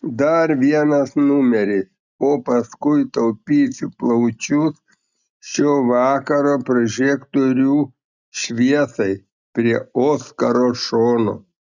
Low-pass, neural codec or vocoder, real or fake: 7.2 kHz; none; real